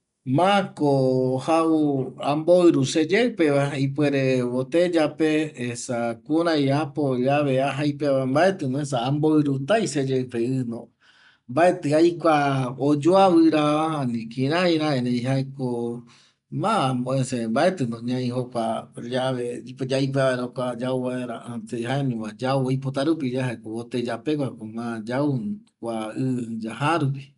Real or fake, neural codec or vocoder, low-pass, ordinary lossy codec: real; none; 10.8 kHz; none